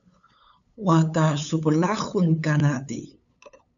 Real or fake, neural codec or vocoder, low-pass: fake; codec, 16 kHz, 8 kbps, FunCodec, trained on LibriTTS, 25 frames a second; 7.2 kHz